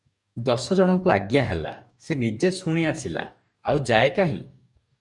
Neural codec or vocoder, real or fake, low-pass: codec, 44.1 kHz, 2.6 kbps, DAC; fake; 10.8 kHz